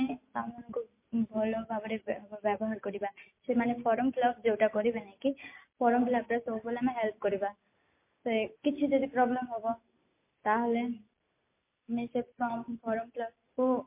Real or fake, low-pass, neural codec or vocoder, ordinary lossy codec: real; 3.6 kHz; none; MP3, 24 kbps